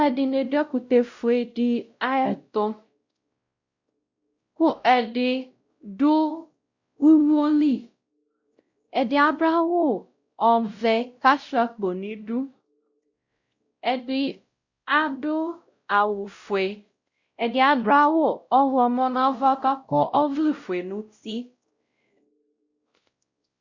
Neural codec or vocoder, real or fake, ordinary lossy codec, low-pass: codec, 16 kHz, 0.5 kbps, X-Codec, WavLM features, trained on Multilingual LibriSpeech; fake; Opus, 64 kbps; 7.2 kHz